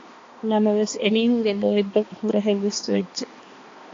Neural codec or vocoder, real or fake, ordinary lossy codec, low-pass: codec, 16 kHz, 2 kbps, X-Codec, HuBERT features, trained on balanced general audio; fake; AAC, 32 kbps; 7.2 kHz